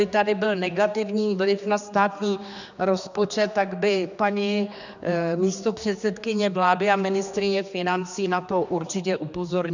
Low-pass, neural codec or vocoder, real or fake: 7.2 kHz; codec, 16 kHz, 2 kbps, X-Codec, HuBERT features, trained on general audio; fake